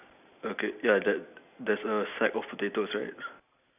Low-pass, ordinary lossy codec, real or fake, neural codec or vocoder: 3.6 kHz; none; real; none